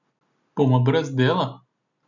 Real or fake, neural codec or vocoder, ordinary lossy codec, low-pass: real; none; none; 7.2 kHz